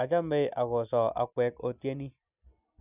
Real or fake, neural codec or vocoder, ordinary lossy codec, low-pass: real; none; none; 3.6 kHz